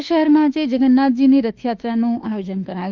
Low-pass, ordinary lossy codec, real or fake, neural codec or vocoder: 7.2 kHz; Opus, 32 kbps; fake; autoencoder, 48 kHz, 32 numbers a frame, DAC-VAE, trained on Japanese speech